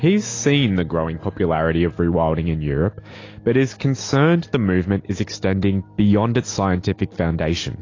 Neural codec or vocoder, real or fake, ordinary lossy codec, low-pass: none; real; AAC, 32 kbps; 7.2 kHz